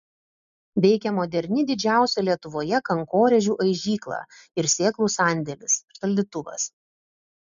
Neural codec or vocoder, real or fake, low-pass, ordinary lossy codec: none; real; 7.2 kHz; MP3, 96 kbps